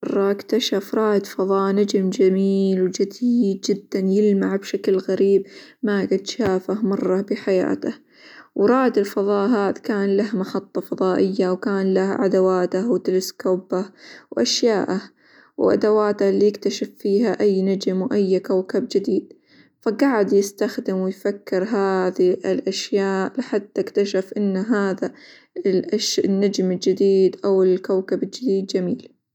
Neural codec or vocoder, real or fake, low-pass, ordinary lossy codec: none; real; 19.8 kHz; none